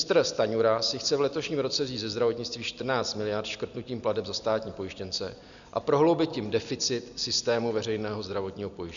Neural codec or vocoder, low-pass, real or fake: none; 7.2 kHz; real